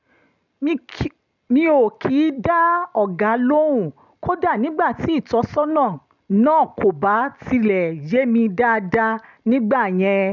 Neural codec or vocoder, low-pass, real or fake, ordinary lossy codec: none; 7.2 kHz; real; none